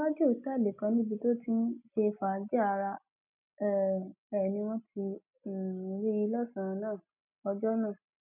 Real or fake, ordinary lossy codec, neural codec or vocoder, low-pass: real; none; none; 3.6 kHz